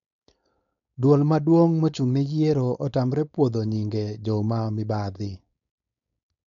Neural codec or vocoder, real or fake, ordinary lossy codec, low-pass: codec, 16 kHz, 4.8 kbps, FACodec; fake; none; 7.2 kHz